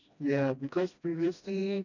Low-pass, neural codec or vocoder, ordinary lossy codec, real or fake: 7.2 kHz; codec, 16 kHz, 1 kbps, FreqCodec, smaller model; none; fake